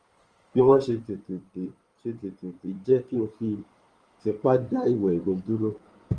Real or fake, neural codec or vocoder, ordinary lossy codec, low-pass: fake; codec, 16 kHz in and 24 kHz out, 2.2 kbps, FireRedTTS-2 codec; Opus, 24 kbps; 9.9 kHz